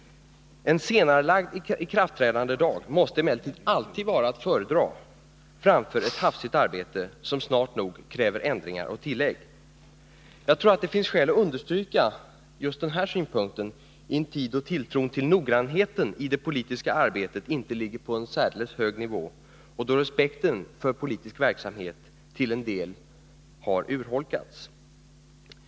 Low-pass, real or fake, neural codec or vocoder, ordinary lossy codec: none; real; none; none